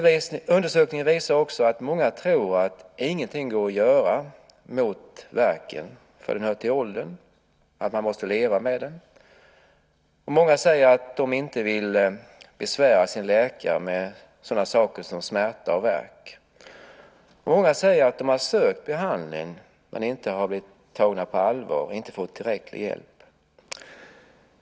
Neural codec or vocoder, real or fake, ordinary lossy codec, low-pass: none; real; none; none